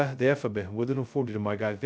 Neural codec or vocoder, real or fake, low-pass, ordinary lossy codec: codec, 16 kHz, 0.2 kbps, FocalCodec; fake; none; none